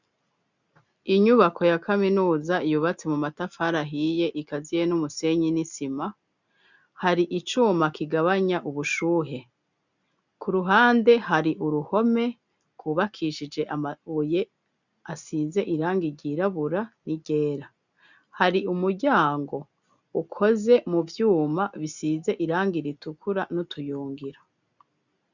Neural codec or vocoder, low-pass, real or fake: none; 7.2 kHz; real